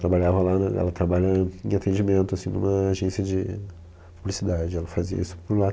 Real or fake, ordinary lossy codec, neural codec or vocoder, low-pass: real; none; none; none